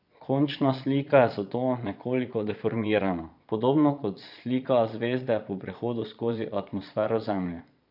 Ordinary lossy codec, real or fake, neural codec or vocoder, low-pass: none; fake; vocoder, 22.05 kHz, 80 mel bands, Vocos; 5.4 kHz